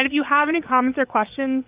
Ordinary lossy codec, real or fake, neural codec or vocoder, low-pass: Opus, 32 kbps; fake; vocoder, 22.05 kHz, 80 mel bands, Vocos; 3.6 kHz